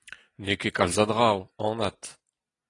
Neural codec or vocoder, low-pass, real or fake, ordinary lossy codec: none; 10.8 kHz; real; AAC, 32 kbps